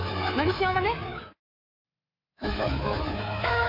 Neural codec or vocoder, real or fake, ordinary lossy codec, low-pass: codec, 24 kHz, 3.1 kbps, DualCodec; fake; MP3, 32 kbps; 5.4 kHz